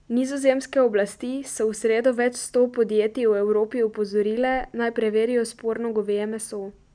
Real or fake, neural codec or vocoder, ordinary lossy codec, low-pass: real; none; none; 9.9 kHz